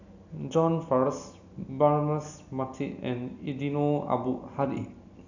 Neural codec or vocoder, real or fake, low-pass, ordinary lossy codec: none; real; 7.2 kHz; none